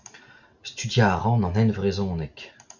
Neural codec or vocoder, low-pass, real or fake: none; 7.2 kHz; real